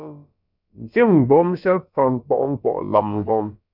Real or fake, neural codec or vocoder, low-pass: fake; codec, 16 kHz, about 1 kbps, DyCAST, with the encoder's durations; 5.4 kHz